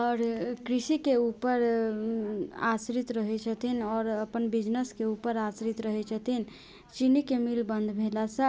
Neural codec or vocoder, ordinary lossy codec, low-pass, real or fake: none; none; none; real